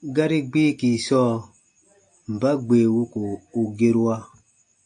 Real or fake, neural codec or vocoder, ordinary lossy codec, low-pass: real; none; AAC, 64 kbps; 9.9 kHz